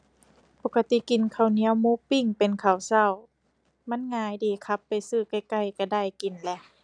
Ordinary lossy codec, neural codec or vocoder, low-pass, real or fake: none; none; 9.9 kHz; real